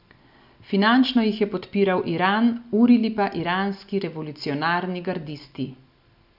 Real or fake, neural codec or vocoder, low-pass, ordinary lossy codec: real; none; 5.4 kHz; none